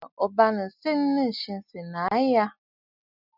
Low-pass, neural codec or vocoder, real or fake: 5.4 kHz; none; real